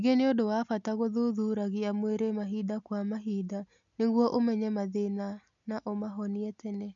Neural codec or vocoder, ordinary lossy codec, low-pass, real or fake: none; none; 7.2 kHz; real